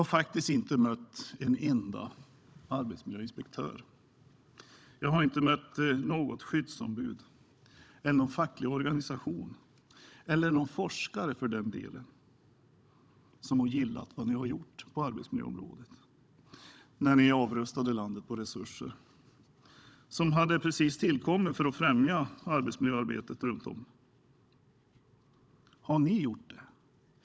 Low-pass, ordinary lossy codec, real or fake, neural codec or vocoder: none; none; fake; codec, 16 kHz, 16 kbps, FunCodec, trained on Chinese and English, 50 frames a second